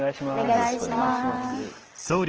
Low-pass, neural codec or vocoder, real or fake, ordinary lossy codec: 7.2 kHz; none; real; Opus, 16 kbps